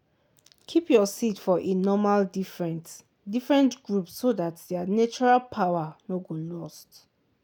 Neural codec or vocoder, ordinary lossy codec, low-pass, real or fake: none; none; none; real